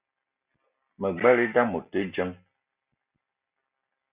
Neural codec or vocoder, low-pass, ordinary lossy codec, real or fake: none; 3.6 kHz; Opus, 64 kbps; real